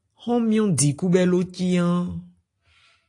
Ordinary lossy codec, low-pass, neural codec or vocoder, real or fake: AAC, 48 kbps; 10.8 kHz; none; real